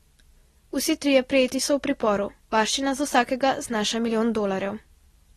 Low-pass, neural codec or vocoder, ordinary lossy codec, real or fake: 19.8 kHz; none; AAC, 32 kbps; real